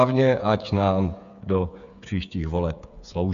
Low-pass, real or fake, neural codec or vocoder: 7.2 kHz; fake; codec, 16 kHz, 8 kbps, FreqCodec, smaller model